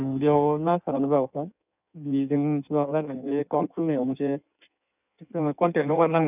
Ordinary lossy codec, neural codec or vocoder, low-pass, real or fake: none; codec, 16 kHz in and 24 kHz out, 1.1 kbps, FireRedTTS-2 codec; 3.6 kHz; fake